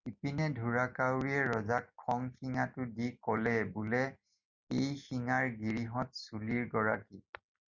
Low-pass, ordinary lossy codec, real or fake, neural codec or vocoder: 7.2 kHz; Opus, 64 kbps; real; none